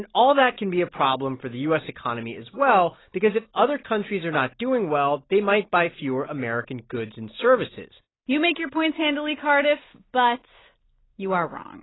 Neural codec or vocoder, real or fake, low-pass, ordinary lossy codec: none; real; 7.2 kHz; AAC, 16 kbps